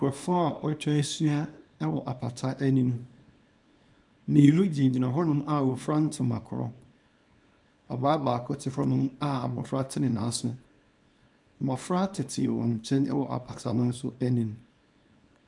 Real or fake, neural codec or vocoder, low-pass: fake; codec, 24 kHz, 0.9 kbps, WavTokenizer, small release; 10.8 kHz